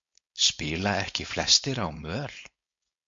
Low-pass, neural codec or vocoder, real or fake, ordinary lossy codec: 7.2 kHz; codec, 16 kHz, 4.8 kbps, FACodec; fake; MP3, 48 kbps